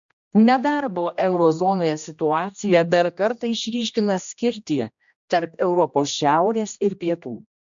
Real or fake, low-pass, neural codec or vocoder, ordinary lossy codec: fake; 7.2 kHz; codec, 16 kHz, 1 kbps, X-Codec, HuBERT features, trained on general audio; MP3, 64 kbps